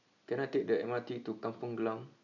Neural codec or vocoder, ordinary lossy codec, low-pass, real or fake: none; none; 7.2 kHz; real